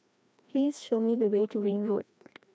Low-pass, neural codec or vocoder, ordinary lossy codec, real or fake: none; codec, 16 kHz, 1 kbps, FreqCodec, larger model; none; fake